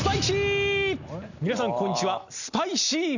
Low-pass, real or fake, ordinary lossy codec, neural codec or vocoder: 7.2 kHz; real; none; none